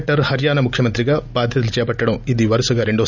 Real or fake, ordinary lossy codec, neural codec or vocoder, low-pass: real; none; none; 7.2 kHz